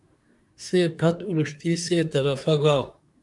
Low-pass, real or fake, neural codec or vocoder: 10.8 kHz; fake; codec, 24 kHz, 1 kbps, SNAC